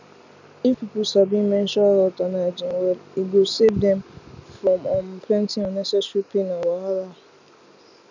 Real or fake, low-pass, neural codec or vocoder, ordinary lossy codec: real; 7.2 kHz; none; none